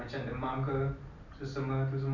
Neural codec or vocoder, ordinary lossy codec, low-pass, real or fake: none; none; 7.2 kHz; real